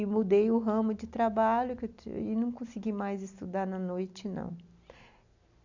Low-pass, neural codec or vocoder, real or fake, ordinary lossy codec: 7.2 kHz; none; real; none